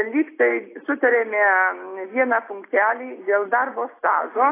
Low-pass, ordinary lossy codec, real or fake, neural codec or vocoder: 3.6 kHz; AAC, 24 kbps; real; none